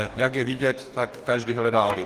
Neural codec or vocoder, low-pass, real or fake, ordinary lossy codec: codec, 44.1 kHz, 2.6 kbps, DAC; 14.4 kHz; fake; Opus, 24 kbps